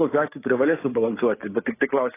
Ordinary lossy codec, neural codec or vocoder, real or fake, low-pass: AAC, 16 kbps; codec, 16 kHz, 6 kbps, DAC; fake; 3.6 kHz